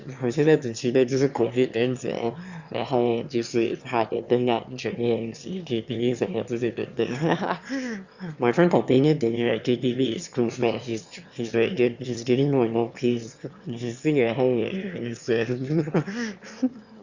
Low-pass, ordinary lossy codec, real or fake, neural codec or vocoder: 7.2 kHz; Opus, 64 kbps; fake; autoencoder, 22.05 kHz, a latent of 192 numbers a frame, VITS, trained on one speaker